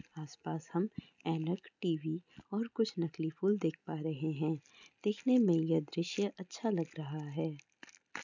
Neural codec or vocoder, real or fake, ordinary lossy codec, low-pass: none; real; none; 7.2 kHz